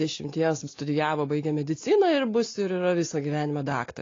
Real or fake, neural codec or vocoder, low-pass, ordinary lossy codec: real; none; 7.2 kHz; AAC, 32 kbps